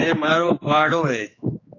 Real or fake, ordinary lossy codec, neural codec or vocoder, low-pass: fake; AAC, 32 kbps; codec, 16 kHz, 2 kbps, X-Codec, HuBERT features, trained on general audio; 7.2 kHz